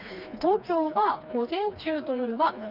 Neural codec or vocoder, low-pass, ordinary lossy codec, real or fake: codec, 16 kHz, 2 kbps, FreqCodec, smaller model; 5.4 kHz; none; fake